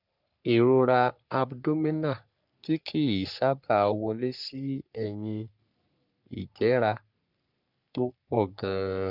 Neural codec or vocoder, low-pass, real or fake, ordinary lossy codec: codec, 44.1 kHz, 3.4 kbps, Pupu-Codec; 5.4 kHz; fake; none